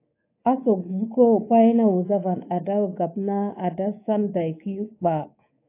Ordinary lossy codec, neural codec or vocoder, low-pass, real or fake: AAC, 24 kbps; codec, 24 kHz, 3.1 kbps, DualCodec; 3.6 kHz; fake